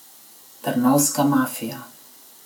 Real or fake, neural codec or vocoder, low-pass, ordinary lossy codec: real; none; none; none